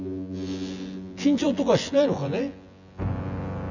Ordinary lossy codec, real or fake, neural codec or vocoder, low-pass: none; fake; vocoder, 24 kHz, 100 mel bands, Vocos; 7.2 kHz